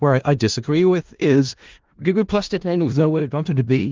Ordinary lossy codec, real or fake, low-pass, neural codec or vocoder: Opus, 32 kbps; fake; 7.2 kHz; codec, 16 kHz in and 24 kHz out, 0.4 kbps, LongCat-Audio-Codec, four codebook decoder